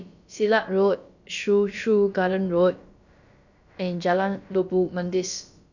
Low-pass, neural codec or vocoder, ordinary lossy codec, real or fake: 7.2 kHz; codec, 16 kHz, about 1 kbps, DyCAST, with the encoder's durations; none; fake